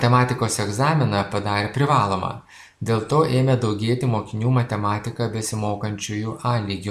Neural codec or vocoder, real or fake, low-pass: none; real; 14.4 kHz